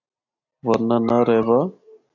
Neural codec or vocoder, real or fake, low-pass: none; real; 7.2 kHz